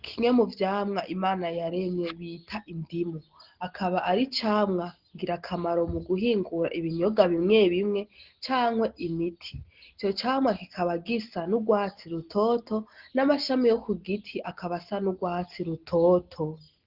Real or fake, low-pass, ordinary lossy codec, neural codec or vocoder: real; 5.4 kHz; Opus, 16 kbps; none